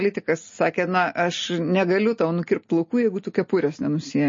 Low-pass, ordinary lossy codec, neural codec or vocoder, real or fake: 7.2 kHz; MP3, 32 kbps; none; real